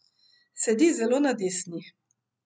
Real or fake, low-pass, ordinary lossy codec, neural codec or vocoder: real; none; none; none